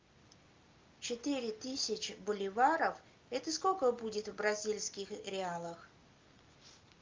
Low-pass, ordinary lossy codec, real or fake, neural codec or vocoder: 7.2 kHz; Opus, 24 kbps; real; none